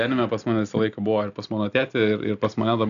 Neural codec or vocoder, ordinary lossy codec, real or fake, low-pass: none; MP3, 96 kbps; real; 7.2 kHz